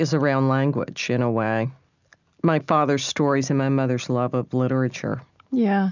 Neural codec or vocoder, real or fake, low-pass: none; real; 7.2 kHz